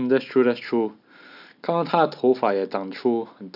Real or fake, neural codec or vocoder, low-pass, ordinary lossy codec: real; none; 5.4 kHz; none